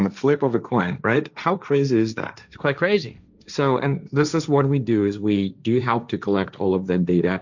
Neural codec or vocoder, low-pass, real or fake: codec, 16 kHz, 1.1 kbps, Voila-Tokenizer; 7.2 kHz; fake